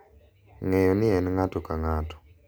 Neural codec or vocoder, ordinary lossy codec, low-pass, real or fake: none; none; none; real